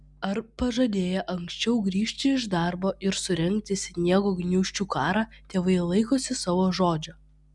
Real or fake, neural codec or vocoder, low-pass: real; none; 10.8 kHz